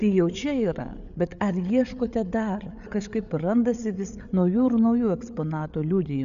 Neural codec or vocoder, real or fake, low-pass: codec, 16 kHz, 8 kbps, FreqCodec, larger model; fake; 7.2 kHz